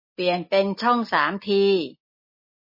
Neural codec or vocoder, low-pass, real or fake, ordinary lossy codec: none; 5.4 kHz; real; MP3, 24 kbps